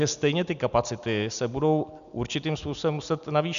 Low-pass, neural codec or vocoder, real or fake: 7.2 kHz; none; real